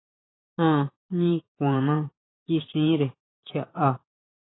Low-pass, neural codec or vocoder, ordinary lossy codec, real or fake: 7.2 kHz; vocoder, 44.1 kHz, 128 mel bands every 512 samples, BigVGAN v2; AAC, 16 kbps; fake